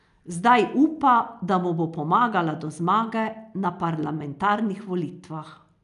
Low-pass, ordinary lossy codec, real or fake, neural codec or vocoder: 10.8 kHz; none; real; none